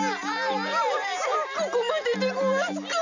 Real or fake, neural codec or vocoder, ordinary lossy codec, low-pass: real; none; none; 7.2 kHz